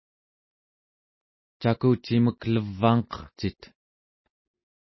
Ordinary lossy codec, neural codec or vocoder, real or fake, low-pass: MP3, 24 kbps; none; real; 7.2 kHz